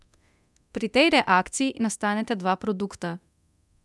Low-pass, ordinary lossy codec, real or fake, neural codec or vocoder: none; none; fake; codec, 24 kHz, 0.9 kbps, DualCodec